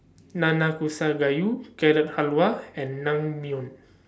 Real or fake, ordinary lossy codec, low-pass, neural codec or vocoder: real; none; none; none